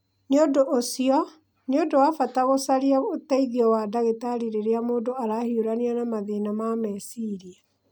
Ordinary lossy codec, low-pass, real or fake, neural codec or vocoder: none; none; real; none